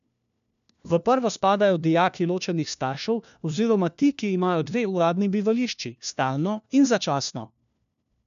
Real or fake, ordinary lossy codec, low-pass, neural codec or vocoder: fake; none; 7.2 kHz; codec, 16 kHz, 1 kbps, FunCodec, trained on LibriTTS, 50 frames a second